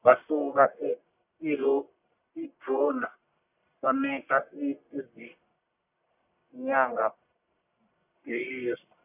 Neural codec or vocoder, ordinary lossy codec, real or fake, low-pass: codec, 44.1 kHz, 1.7 kbps, Pupu-Codec; none; fake; 3.6 kHz